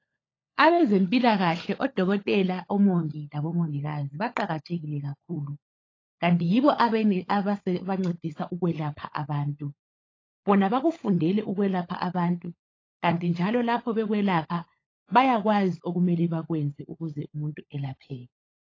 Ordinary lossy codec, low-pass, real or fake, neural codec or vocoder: AAC, 32 kbps; 7.2 kHz; fake; codec, 16 kHz, 16 kbps, FunCodec, trained on LibriTTS, 50 frames a second